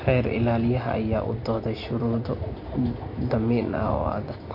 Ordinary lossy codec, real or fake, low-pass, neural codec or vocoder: MP3, 32 kbps; real; 5.4 kHz; none